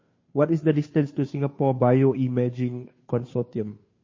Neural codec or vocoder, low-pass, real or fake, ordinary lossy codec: codec, 16 kHz, 2 kbps, FunCodec, trained on Chinese and English, 25 frames a second; 7.2 kHz; fake; MP3, 32 kbps